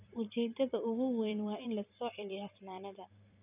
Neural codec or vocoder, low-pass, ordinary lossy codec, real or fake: vocoder, 44.1 kHz, 128 mel bands every 256 samples, BigVGAN v2; 3.6 kHz; none; fake